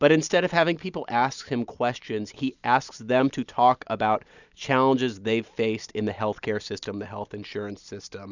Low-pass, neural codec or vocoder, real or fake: 7.2 kHz; none; real